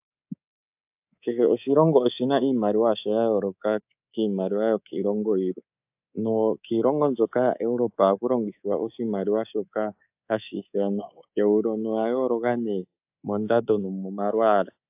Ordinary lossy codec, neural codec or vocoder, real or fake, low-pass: AAC, 32 kbps; codec, 24 kHz, 3.1 kbps, DualCodec; fake; 3.6 kHz